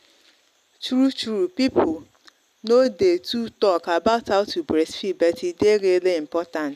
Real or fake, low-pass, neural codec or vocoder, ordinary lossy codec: real; 14.4 kHz; none; none